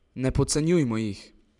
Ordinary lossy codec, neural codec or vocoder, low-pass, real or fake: none; vocoder, 44.1 kHz, 128 mel bands every 512 samples, BigVGAN v2; 10.8 kHz; fake